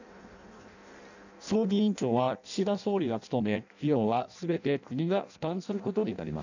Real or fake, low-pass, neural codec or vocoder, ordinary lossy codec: fake; 7.2 kHz; codec, 16 kHz in and 24 kHz out, 0.6 kbps, FireRedTTS-2 codec; none